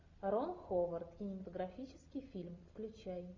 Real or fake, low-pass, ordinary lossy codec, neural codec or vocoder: real; 7.2 kHz; MP3, 48 kbps; none